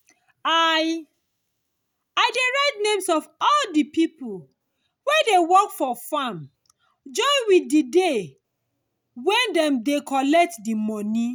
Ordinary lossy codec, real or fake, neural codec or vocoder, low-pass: none; real; none; none